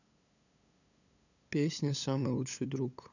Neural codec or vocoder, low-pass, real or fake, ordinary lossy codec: codec, 16 kHz, 8 kbps, FunCodec, trained on LibriTTS, 25 frames a second; 7.2 kHz; fake; none